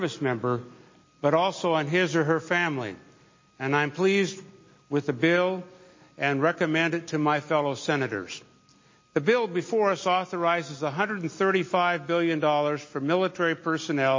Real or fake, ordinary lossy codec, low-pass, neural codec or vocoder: real; MP3, 32 kbps; 7.2 kHz; none